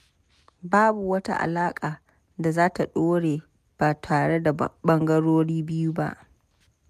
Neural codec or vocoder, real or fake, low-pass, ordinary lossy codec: none; real; 14.4 kHz; MP3, 96 kbps